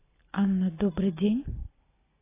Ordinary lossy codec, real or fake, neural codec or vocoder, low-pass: AAC, 16 kbps; real; none; 3.6 kHz